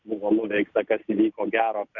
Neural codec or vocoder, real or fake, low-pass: none; real; 7.2 kHz